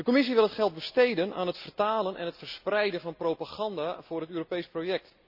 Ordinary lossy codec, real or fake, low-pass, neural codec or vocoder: none; real; 5.4 kHz; none